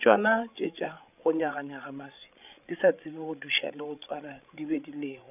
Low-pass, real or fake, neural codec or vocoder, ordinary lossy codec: 3.6 kHz; fake; codec, 16 kHz, 16 kbps, FreqCodec, larger model; none